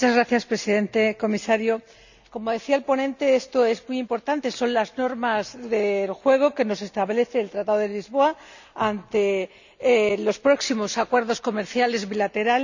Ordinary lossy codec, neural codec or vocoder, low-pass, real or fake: none; none; 7.2 kHz; real